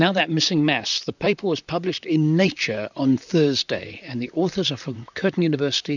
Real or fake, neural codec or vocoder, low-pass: fake; vocoder, 44.1 kHz, 128 mel bands, Pupu-Vocoder; 7.2 kHz